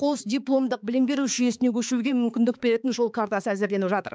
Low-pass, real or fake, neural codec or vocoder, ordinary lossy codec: none; fake; codec, 16 kHz, 4 kbps, X-Codec, HuBERT features, trained on balanced general audio; none